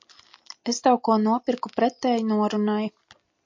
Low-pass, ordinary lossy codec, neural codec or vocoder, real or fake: 7.2 kHz; MP3, 48 kbps; none; real